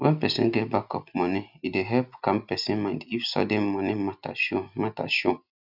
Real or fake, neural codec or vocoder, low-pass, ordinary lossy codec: real; none; 5.4 kHz; none